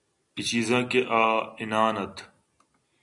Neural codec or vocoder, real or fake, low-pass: none; real; 10.8 kHz